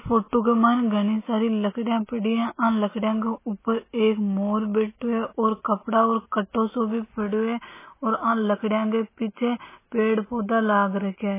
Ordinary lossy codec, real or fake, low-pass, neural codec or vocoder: MP3, 16 kbps; real; 3.6 kHz; none